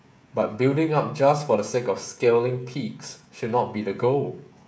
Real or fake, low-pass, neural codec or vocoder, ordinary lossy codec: fake; none; codec, 16 kHz, 16 kbps, FreqCodec, smaller model; none